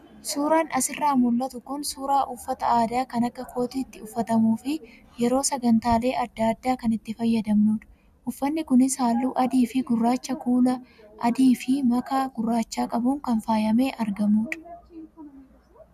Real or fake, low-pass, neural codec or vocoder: real; 14.4 kHz; none